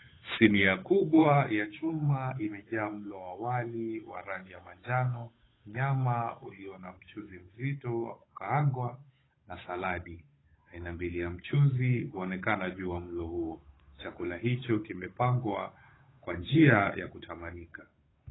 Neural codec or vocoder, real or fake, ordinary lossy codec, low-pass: codec, 16 kHz, 8 kbps, FunCodec, trained on Chinese and English, 25 frames a second; fake; AAC, 16 kbps; 7.2 kHz